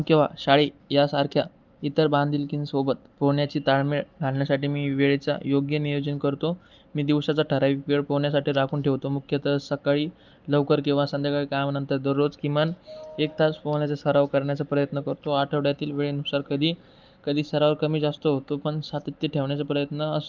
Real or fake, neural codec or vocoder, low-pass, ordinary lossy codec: real; none; 7.2 kHz; Opus, 32 kbps